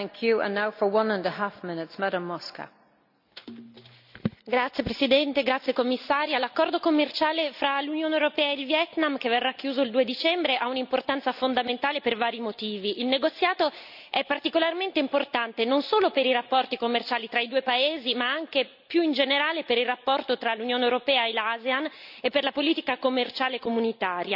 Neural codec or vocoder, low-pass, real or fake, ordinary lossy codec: none; 5.4 kHz; real; none